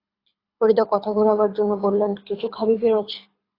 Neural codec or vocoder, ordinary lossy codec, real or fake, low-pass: codec, 24 kHz, 6 kbps, HILCodec; AAC, 24 kbps; fake; 5.4 kHz